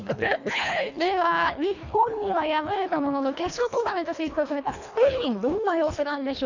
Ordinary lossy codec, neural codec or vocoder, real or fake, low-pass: none; codec, 24 kHz, 1.5 kbps, HILCodec; fake; 7.2 kHz